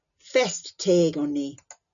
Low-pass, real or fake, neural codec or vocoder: 7.2 kHz; real; none